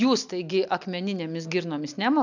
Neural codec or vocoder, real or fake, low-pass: none; real; 7.2 kHz